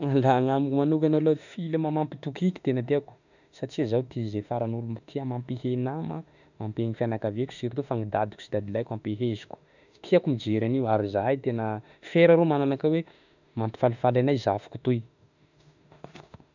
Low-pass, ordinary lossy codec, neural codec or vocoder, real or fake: 7.2 kHz; none; autoencoder, 48 kHz, 32 numbers a frame, DAC-VAE, trained on Japanese speech; fake